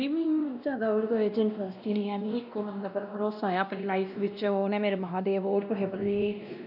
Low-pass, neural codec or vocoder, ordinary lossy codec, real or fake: 5.4 kHz; codec, 16 kHz, 1 kbps, X-Codec, WavLM features, trained on Multilingual LibriSpeech; none; fake